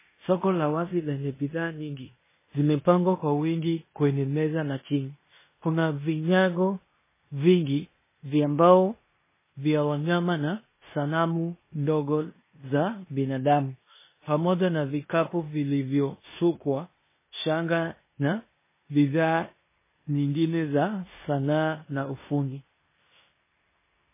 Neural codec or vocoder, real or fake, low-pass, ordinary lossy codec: codec, 16 kHz in and 24 kHz out, 0.9 kbps, LongCat-Audio-Codec, four codebook decoder; fake; 3.6 kHz; MP3, 16 kbps